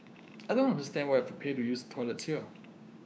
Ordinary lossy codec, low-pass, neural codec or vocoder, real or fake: none; none; codec, 16 kHz, 6 kbps, DAC; fake